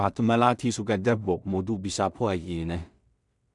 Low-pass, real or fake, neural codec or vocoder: 10.8 kHz; fake; codec, 16 kHz in and 24 kHz out, 0.4 kbps, LongCat-Audio-Codec, two codebook decoder